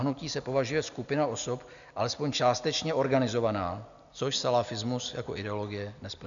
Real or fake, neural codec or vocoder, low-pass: real; none; 7.2 kHz